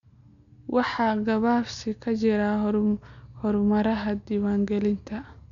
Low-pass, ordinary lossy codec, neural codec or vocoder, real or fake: 7.2 kHz; none; none; real